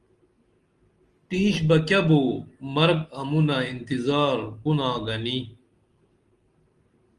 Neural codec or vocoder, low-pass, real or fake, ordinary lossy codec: none; 10.8 kHz; real; Opus, 24 kbps